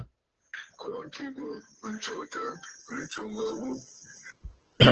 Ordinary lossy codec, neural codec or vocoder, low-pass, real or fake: Opus, 16 kbps; codec, 16 kHz, 2 kbps, FunCodec, trained on Chinese and English, 25 frames a second; 7.2 kHz; fake